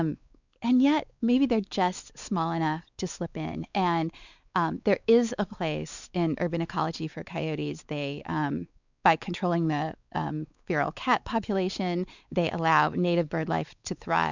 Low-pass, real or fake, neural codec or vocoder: 7.2 kHz; fake; codec, 16 kHz, 4 kbps, X-Codec, WavLM features, trained on Multilingual LibriSpeech